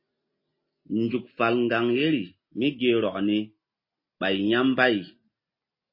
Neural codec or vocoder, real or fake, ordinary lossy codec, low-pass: none; real; MP3, 24 kbps; 5.4 kHz